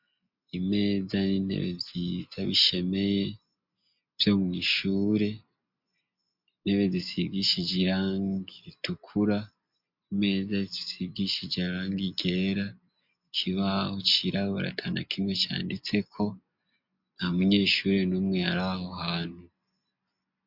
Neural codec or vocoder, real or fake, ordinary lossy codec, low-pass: none; real; MP3, 48 kbps; 5.4 kHz